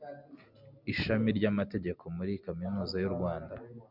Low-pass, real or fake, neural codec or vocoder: 5.4 kHz; real; none